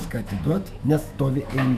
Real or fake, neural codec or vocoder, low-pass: fake; autoencoder, 48 kHz, 128 numbers a frame, DAC-VAE, trained on Japanese speech; 14.4 kHz